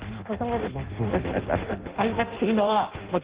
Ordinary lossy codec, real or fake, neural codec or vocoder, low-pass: Opus, 16 kbps; fake; codec, 16 kHz in and 24 kHz out, 0.6 kbps, FireRedTTS-2 codec; 3.6 kHz